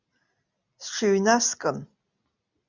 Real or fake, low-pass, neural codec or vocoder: real; 7.2 kHz; none